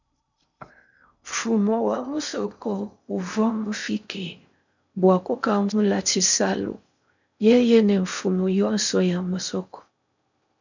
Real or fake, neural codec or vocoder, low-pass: fake; codec, 16 kHz in and 24 kHz out, 0.6 kbps, FocalCodec, streaming, 2048 codes; 7.2 kHz